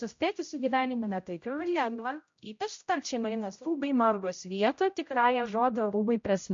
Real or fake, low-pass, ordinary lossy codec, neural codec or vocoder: fake; 7.2 kHz; MP3, 48 kbps; codec, 16 kHz, 0.5 kbps, X-Codec, HuBERT features, trained on general audio